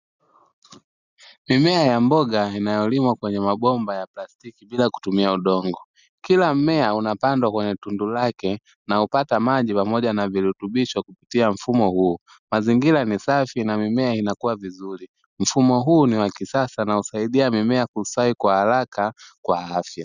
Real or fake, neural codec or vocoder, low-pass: real; none; 7.2 kHz